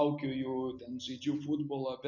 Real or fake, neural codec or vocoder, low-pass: real; none; 7.2 kHz